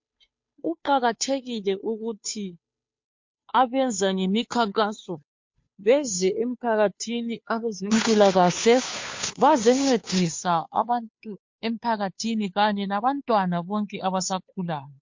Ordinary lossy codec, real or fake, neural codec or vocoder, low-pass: MP3, 48 kbps; fake; codec, 16 kHz, 2 kbps, FunCodec, trained on Chinese and English, 25 frames a second; 7.2 kHz